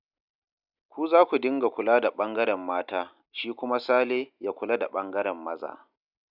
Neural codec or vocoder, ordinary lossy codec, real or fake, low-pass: none; none; real; 5.4 kHz